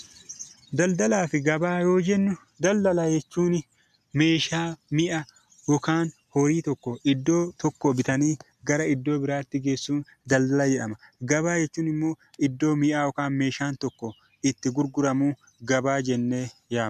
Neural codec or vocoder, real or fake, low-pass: none; real; 14.4 kHz